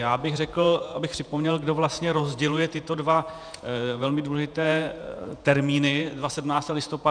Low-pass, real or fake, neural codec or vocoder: 9.9 kHz; fake; vocoder, 48 kHz, 128 mel bands, Vocos